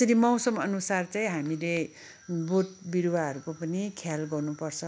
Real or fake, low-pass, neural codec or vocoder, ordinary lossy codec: real; none; none; none